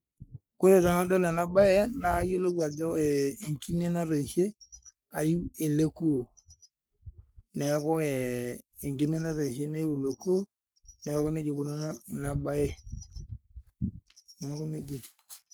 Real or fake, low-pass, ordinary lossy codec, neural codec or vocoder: fake; none; none; codec, 44.1 kHz, 3.4 kbps, Pupu-Codec